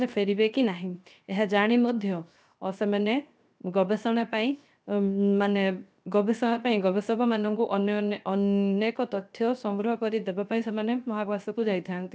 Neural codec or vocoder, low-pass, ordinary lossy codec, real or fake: codec, 16 kHz, 0.7 kbps, FocalCodec; none; none; fake